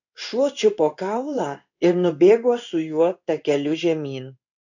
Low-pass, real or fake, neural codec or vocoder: 7.2 kHz; fake; codec, 16 kHz in and 24 kHz out, 1 kbps, XY-Tokenizer